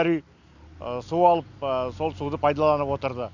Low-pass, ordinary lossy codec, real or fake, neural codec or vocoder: 7.2 kHz; none; real; none